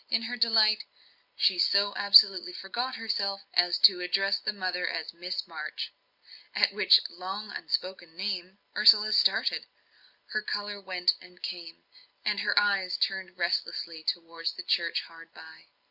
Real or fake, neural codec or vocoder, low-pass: real; none; 5.4 kHz